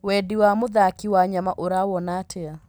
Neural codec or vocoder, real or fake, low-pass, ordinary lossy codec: none; real; none; none